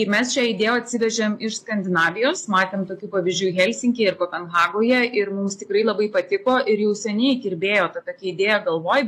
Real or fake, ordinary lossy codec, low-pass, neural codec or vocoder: real; AAC, 48 kbps; 14.4 kHz; none